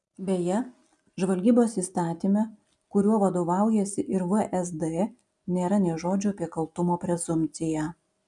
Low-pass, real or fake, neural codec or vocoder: 10.8 kHz; real; none